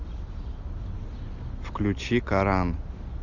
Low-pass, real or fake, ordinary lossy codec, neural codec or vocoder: 7.2 kHz; real; Opus, 64 kbps; none